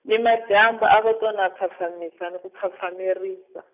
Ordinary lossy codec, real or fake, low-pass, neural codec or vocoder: none; real; 3.6 kHz; none